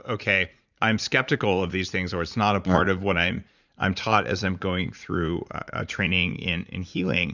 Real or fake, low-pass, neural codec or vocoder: fake; 7.2 kHz; vocoder, 44.1 kHz, 80 mel bands, Vocos